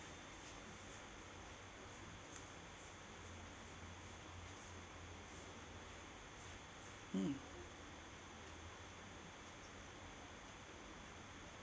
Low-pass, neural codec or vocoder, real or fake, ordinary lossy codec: none; none; real; none